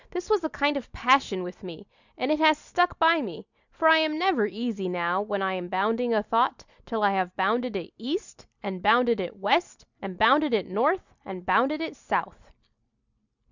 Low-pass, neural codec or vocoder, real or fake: 7.2 kHz; none; real